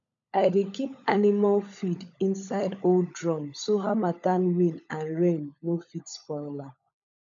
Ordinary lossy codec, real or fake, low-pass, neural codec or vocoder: none; fake; 7.2 kHz; codec, 16 kHz, 16 kbps, FunCodec, trained on LibriTTS, 50 frames a second